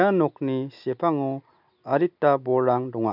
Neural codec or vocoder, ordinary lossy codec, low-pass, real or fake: none; none; 5.4 kHz; real